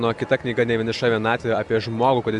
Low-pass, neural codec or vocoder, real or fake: 10.8 kHz; none; real